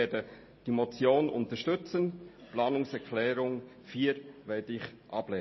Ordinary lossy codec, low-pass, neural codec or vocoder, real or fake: MP3, 24 kbps; 7.2 kHz; none; real